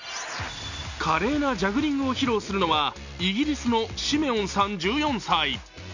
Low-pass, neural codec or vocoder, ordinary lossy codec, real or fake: 7.2 kHz; none; none; real